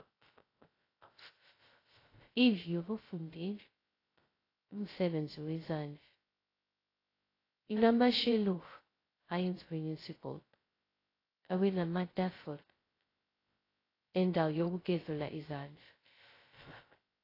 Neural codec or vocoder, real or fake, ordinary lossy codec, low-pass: codec, 16 kHz, 0.2 kbps, FocalCodec; fake; AAC, 24 kbps; 5.4 kHz